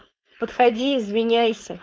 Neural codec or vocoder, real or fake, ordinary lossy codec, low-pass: codec, 16 kHz, 4.8 kbps, FACodec; fake; none; none